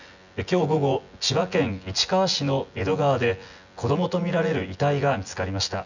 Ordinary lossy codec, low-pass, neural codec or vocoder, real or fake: none; 7.2 kHz; vocoder, 24 kHz, 100 mel bands, Vocos; fake